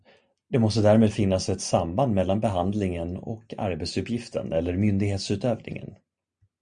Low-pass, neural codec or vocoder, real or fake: 9.9 kHz; none; real